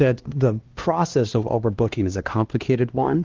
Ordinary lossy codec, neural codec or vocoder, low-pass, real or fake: Opus, 16 kbps; codec, 16 kHz, 1 kbps, X-Codec, HuBERT features, trained on LibriSpeech; 7.2 kHz; fake